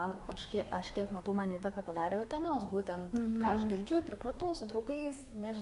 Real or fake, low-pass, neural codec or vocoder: fake; 10.8 kHz; codec, 24 kHz, 1 kbps, SNAC